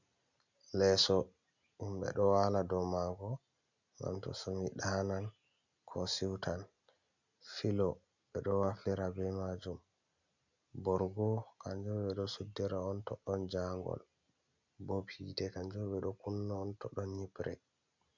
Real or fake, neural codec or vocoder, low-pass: real; none; 7.2 kHz